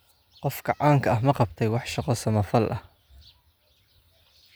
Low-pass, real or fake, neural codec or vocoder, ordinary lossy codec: none; real; none; none